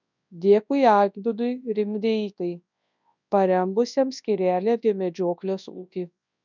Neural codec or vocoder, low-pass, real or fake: codec, 24 kHz, 0.9 kbps, WavTokenizer, large speech release; 7.2 kHz; fake